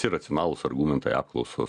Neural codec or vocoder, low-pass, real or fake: none; 10.8 kHz; real